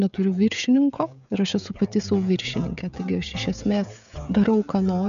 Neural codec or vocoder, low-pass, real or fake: codec, 16 kHz, 16 kbps, FreqCodec, smaller model; 7.2 kHz; fake